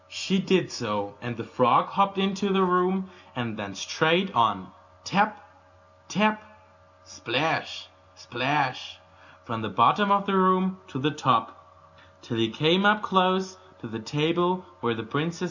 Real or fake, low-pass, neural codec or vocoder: real; 7.2 kHz; none